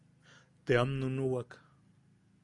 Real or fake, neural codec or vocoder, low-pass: real; none; 10.8 kHz